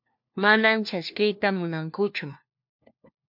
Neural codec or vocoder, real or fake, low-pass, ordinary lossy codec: codec, 16 kHz, 1 kbps, FunCodec, trained on LibriTTS, 50 frames a second; fake; 5.4 kHz; MP3, 48 kbps